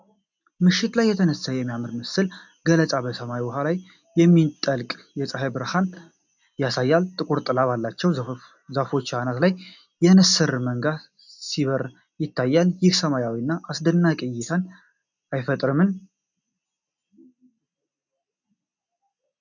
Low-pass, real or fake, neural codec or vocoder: 7.2 kHz; real; none